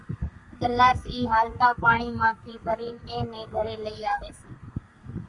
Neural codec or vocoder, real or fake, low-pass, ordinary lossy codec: codec, 32 kHz, 1.9 kbps, SNAC; fake; 10.8 kHz; Opus, 64 kbps